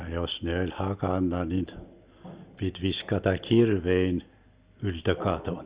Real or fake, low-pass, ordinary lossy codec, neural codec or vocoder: real; 3.6 kHz; Opus, 64 kbps; none